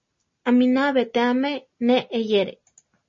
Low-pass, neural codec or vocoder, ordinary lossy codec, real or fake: 7.2 kHz; none; MP3, 32 kbps; real